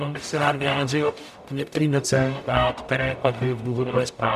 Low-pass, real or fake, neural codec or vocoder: 14.4 kHz; fake; codec, 44.1 kHz, 0.9 kbps, DAC